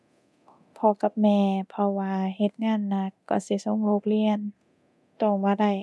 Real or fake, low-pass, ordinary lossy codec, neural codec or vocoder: fake; none; none; codec, 24 kHz, 0.9 kbps, DualCodec